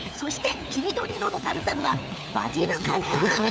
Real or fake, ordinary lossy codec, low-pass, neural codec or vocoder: fake; none; none; codec, 16 kHz, 4 kbps, FunCodec, trained on LibriTTS, 50 frames a second